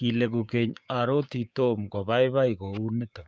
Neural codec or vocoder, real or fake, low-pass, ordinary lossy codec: codec, 16 kHz, 6 kbps, DAC; fake; none; none